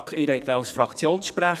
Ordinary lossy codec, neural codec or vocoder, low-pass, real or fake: none; codec, 44.1 kHz, 2.6 kbps, SNAC; 14.4 kHz; fake